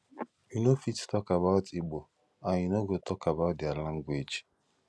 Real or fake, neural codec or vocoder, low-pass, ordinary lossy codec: real; none; none; none